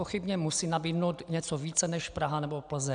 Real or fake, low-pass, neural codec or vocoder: real; 9.9 kHz; none